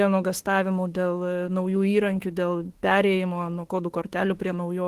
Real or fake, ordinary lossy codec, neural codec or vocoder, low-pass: fake; Opus, 16 kbps; autoencoder, 48 kHz, 32 numbers a frame, DAC-VAE, trained on Japanese speech; 14.4 kHz